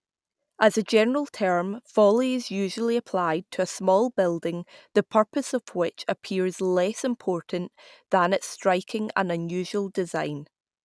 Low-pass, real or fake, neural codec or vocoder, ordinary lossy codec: none; real; none; none